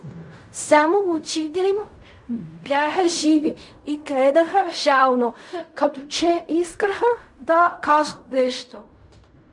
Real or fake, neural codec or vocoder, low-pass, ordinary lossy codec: fake; codec, 16 kHz in and 24 kHz out, 0.4 kbps, LongCat-Audio-Codec, fine tuned four codebook decoder; 10.8 kHz; MP3, 64 kbps